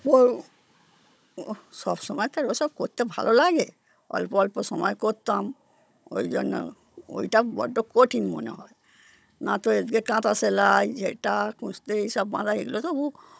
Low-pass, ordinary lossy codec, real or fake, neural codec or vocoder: none; none; fake; codec, 16 kHz, 16 kbps, FunCodec, trained on Chinese and English, 50 frames a second